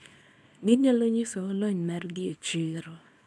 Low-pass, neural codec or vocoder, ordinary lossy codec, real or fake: none; codec, 24 kHz, 0.9 kbps, WavTokenizer, small release; none; fake